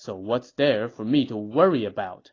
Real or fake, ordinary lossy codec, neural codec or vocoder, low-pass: real; AAC, 32 kbps; none; 7.2 kHz